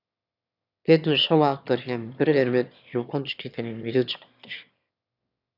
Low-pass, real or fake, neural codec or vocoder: 5.4 kHz; fake; autoencoder, 22.05 kHz, a latent of 192 numbers a frame, VITS, trained on one speaker